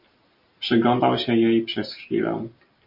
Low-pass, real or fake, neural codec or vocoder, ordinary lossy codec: 5.4 kHz; real; none; MP3, 32 kbps